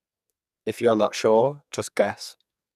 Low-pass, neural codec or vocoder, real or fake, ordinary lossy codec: 14.4 kHz; codec, 44.1 kHz, 2.6 kbps, SNAC; fake; none